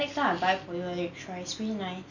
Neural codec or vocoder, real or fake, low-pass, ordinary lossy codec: none; real; 7.2 kHz; none